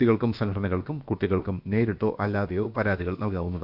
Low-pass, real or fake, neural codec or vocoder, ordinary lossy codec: 5.4 kHz; fake; codec, 16 kHz, about 1 kbps, DyCAST, with the encoder's durations; none